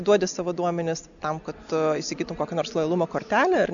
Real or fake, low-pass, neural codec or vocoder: real; 7.2 kHz; none